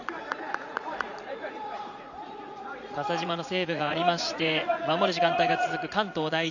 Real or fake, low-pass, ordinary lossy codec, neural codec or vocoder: fake; 7.2 kHz; none; vocoder, 44.1 kHz, 80 mel bands, Vocos